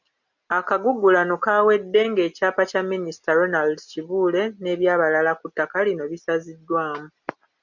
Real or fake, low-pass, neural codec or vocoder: real; 7.2 kHz; none